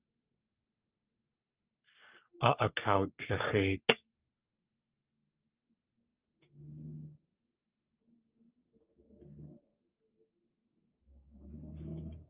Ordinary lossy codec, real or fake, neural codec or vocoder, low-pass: Opus, 24 kbps; fake; codec, 44.1 kHz, 3.4 kbps, Pupu-Codec; 3.6 kHz